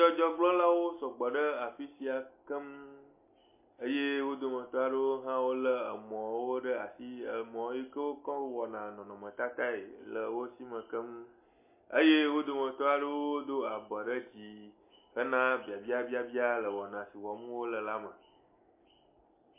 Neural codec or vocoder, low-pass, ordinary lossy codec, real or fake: none; 3.6 kHz; MP3, 24 kbps; real